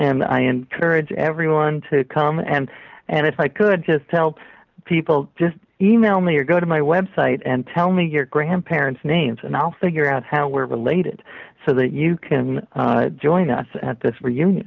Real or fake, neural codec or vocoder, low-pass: real; none; 7.2 kHz